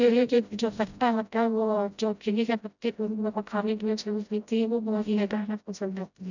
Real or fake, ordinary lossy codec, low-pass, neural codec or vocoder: fake; none; 7.2 kHz; codec, 16 kHz, 0.5 kbps, FreqCodec, smaller model